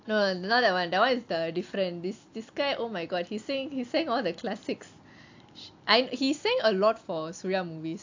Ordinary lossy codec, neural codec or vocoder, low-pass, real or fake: AAC, 48 kbps; none; 7.2 kHz; real